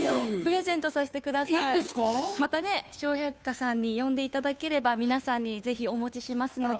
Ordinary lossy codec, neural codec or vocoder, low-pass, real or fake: none; codec, 16 kHz, 2 kbps, FunCodec, trained on Chinese and English, 25 frames a second; none; fake